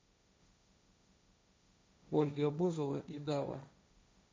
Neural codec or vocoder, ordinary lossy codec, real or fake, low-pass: codec, 16 kHz, 1.1 kbps, Voila-Tokenizer; none; fake; none